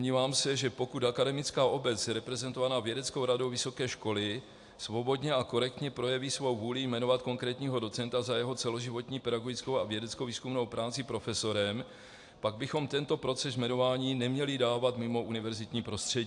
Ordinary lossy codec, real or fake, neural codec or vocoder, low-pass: AAC, 64 kbps; real; none; 10.8 kHz